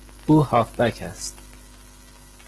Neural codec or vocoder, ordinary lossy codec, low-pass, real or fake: none; Opus, 16 kbps; 10.8 kHz; real